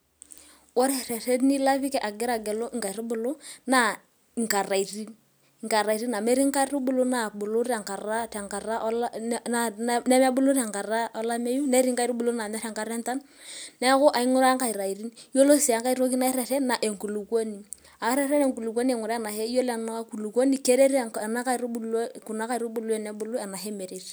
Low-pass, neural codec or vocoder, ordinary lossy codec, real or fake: none; none; none; real